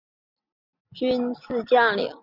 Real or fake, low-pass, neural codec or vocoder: real; 5.4 kHz; none